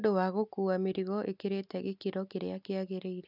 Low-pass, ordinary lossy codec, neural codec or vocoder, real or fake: 5.4 kHz; none; none; real